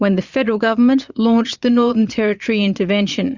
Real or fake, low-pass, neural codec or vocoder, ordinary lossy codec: fake; 7.2 kHz; vocoder, 22.05 kHz, 80 mel bands, Vocos; Opus, 64 kbps